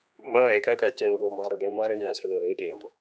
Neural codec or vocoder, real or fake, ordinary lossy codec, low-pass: codec, 16 kHz, 2 kbps, X-Codec, HuBERT features, trained on general audio; fake; none; none